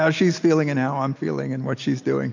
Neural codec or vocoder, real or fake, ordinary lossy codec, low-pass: none; real; AAC, 48 kbps; 7.2 kHz